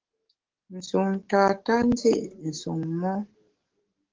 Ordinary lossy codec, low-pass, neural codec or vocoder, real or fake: Opus, 16 kbps; 7.2 kHz; codec, 44.1 kHz, 7.8 kbps, DAC; fake